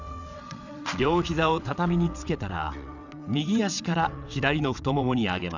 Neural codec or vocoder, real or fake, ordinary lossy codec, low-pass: vocoder, 22.05 kHz, 80 mel bands, WaveNeXt; fake; none; 7.2 kHz